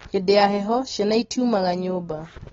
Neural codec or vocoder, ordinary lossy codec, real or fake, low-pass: none; AAC, 24 kbps; real; 7.2 kHz